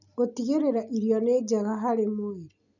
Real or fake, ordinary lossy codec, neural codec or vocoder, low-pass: real; none; none; 7.2 kHz